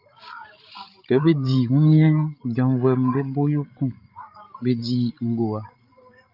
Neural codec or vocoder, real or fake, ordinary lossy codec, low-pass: codec, 16 kHz, 16 kbps, FreqCodec, larger model; fake; Opus, 24 kbps; 5.4 kHz